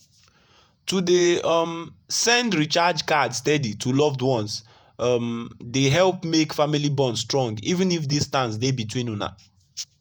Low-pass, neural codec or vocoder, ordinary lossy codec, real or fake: none; vocoder, 48 kHz, 128 mel bands, Vocos; none; fake